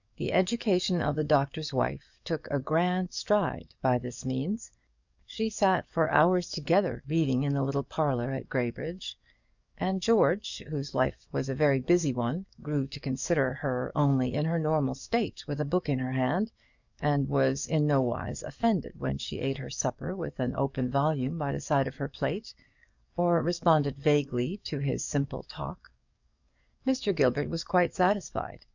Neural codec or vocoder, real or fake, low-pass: codec, 44.1 kHz, 7.8 kbps, DAC; fake; 7.2 kHz